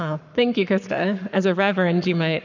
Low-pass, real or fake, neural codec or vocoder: 7.2 kHz; fake; codec, 44.1 kHz, 7.8 kbps, Pupu-Codec